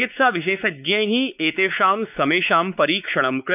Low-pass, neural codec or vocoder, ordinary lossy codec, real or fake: 3.6 kHz; codec, 16 kHz, 4 kbps, X-Codec, WavLM features, trained on Multilingual LibriSpeech; none; fake